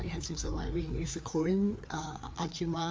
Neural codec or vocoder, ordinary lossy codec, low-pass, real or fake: codec, 16 kHz, 4 kbps, FreqCodec, larger model; none; none; fake